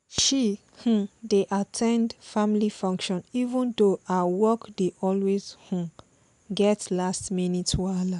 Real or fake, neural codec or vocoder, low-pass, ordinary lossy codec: real; none; 10.8 kHz; none